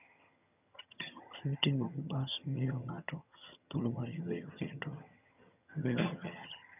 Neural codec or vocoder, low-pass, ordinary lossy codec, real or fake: vocoder, 22.05 kHz, 80 mel bands, HiFi-GAN; 3.6 kHz; none; fake